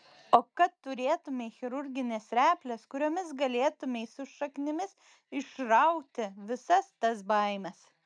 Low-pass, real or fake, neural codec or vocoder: 9.9 kHz; real; none